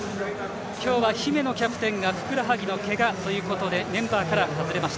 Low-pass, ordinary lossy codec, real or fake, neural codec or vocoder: none; none; real; none